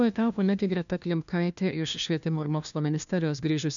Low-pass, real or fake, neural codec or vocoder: 7.2 kHz; fake; codec, 16 kHz, 1 kbps, FunCodec, trained on LibriTTS, 50 frames a second